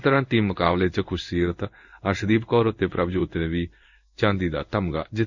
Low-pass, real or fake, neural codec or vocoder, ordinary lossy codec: 7.2 kHz; fake; codec, 16 kHz in and 24 kHz out, 1 kbps, XY-Tokenizer; none